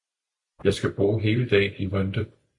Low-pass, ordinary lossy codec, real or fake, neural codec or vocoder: 10.8 kHz; MP3, 64 kbps; fake; vocoder, 44.1 kHz, 128 mel bands every 256 samples, BigVGAN v2